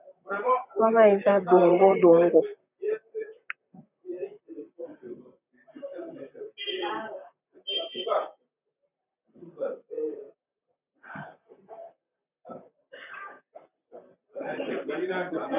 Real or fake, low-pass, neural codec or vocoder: fake; 3.6 kHz; vocoder, 44.1 kHz, 128 mel bands, Pupu-Vocoder